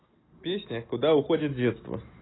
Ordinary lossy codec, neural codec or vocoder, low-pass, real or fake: AAC, 16 kbps; none; 7.2 kHz; real